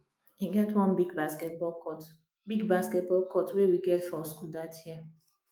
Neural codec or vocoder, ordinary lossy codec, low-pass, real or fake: autoencoder, 48 kHz, 128 numbers a frame, DAC-VAE, trained on Japanese speech; Opus, 32 kbps; 19.8 kHz; fake